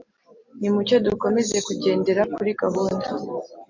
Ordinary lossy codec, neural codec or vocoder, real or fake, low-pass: MP3, 48 kbps; none; real; 7.2 kHz